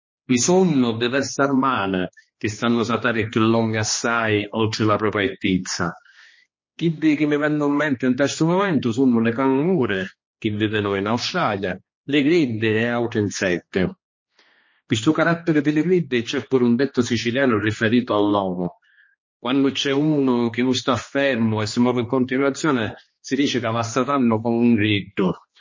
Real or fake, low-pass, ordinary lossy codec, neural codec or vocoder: fake; 7.2 kHz; MP3, 32 kbps; codec, 16 kHz, 2 kbps, X-Codec, HuBERT features, trained on general audio